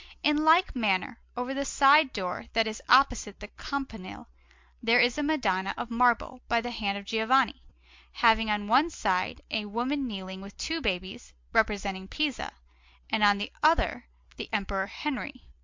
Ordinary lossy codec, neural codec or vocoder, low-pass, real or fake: MP3, 64 kbps; none; 7.2 kHz; real